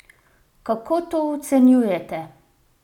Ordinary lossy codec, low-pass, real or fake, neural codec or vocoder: none; 19.8 kHz; real; none